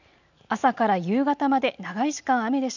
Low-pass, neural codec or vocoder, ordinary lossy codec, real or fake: 7.2 kHz; none; none; real